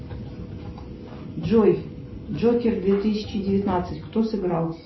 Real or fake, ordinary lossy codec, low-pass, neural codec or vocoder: real; MP3, 24 kbps; 7.2 kHz; none